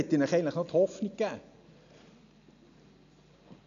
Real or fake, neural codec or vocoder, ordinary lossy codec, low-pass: real; none; none; 7.2 kHz